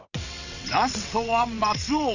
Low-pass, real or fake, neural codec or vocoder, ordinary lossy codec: 7.2 kHz; fake; vocoder, 22.05 kHz, 80 mel bands, WaveNeXt; none